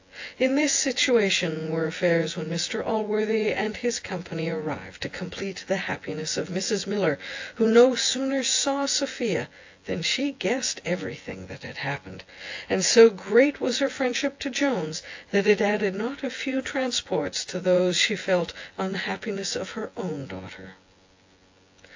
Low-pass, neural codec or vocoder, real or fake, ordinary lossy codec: 7.2 kHz; vocoder, 24 kHz, 100 mel bands, Vocos; fake; AAC, 48 kbps